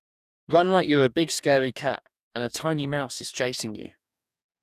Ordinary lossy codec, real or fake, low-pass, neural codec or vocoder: none; fake; 14.4 kHz; codec, 44.1 kHz, 2.6 kbps, DAC